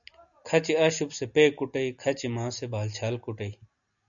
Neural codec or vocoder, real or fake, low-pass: none; real; 7.2 kHz